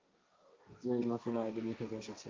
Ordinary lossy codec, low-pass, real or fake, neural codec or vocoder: Opus, 32 kbps; 7.2 kHz; fake; codec, 16 kHz, 1.1 kbps, Voila-Tokenizer